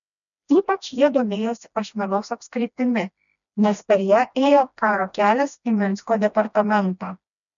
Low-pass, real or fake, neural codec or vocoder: 7.2 kHz; fake; codec, 16 kHz, 1 kbps, FreqCodec, smaller model